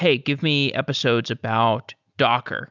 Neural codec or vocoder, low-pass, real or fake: none; 7.2 kHz; real